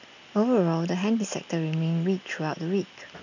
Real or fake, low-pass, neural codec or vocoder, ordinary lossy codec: real; 7.2 kHz; none; none